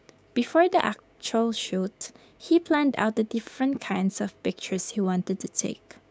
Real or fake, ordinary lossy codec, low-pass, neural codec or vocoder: fake; none; none; codec, 16 kHz, 6 kbps, DAC